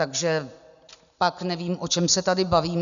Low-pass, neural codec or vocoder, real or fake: 7.2 kHz; none; real